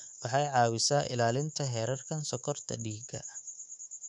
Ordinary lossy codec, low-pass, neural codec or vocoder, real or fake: none; 10.8 kHz; codec, 24 kHz, 3.1 kbps, DualCodec; fake